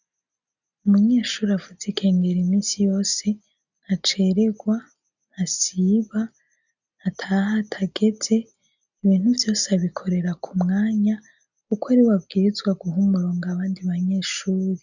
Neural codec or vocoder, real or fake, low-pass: none; real; 7.2 kHz